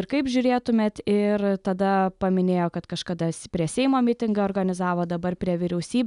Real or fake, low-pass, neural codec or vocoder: real; 10.8 kHz; none